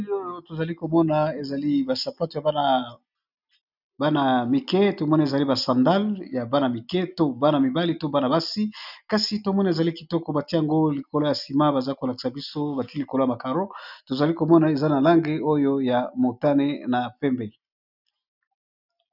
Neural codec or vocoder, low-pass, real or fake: none; 5.4 kHz; real